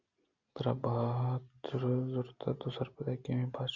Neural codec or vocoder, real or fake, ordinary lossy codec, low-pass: none; real; Opus, 64 kbps; 7.2 kHz